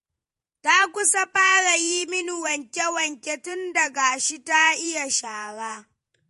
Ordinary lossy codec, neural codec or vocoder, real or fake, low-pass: MP3, 48 kbps; vocoder, 48 kHz, 128 mel bands, Vocos; fake; 14.4 kHz